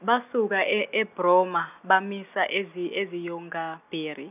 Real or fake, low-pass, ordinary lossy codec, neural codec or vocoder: real; 3.6 kHz; none; none